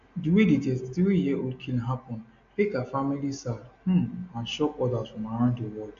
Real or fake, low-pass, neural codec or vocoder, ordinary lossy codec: real; 7.2 kHz; none; AAC, 48 kbps